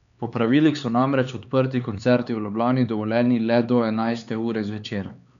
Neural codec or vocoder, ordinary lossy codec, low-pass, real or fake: codec, 16 kHz, 4 kbps, X-Codec, HuBERT features, trained on LibriSpeech; none; 7.2 kHz; fake